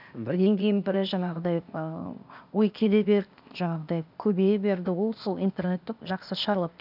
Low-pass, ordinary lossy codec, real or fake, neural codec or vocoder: 5.4 kHz; none; fake; codec, 16 kHz, 0.8 kbps, ZipCodec